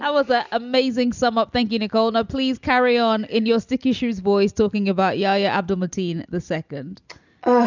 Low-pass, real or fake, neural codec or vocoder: 7.2 kHz; real; none